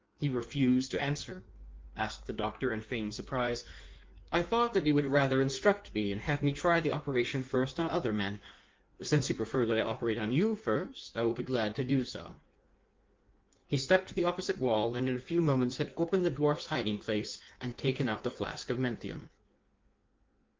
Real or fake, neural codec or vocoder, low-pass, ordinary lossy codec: fake; codec, 16 kHz in and 24 kHz out, 1.1 kbps, FireRedTTS-2 codec; 7.2 kHz; Opus, 32 kbps